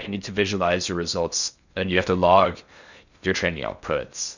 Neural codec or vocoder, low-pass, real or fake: codec, 16 kHz in and 24 kHz out, 0.6 kbps, FocalCodec, streaming, 2048 codes; 7.2 kHz; fake